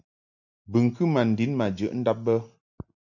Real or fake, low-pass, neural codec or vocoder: real; 7.2 kHz; none